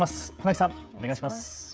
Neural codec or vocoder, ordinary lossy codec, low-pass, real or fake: codec, 16 kHz, 16 kbps, FreqCodec, smaller model; none; none; fake